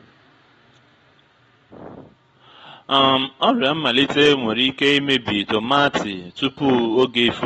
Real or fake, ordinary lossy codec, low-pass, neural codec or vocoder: real; AAC, 24 kbps; 19.8 kHz; none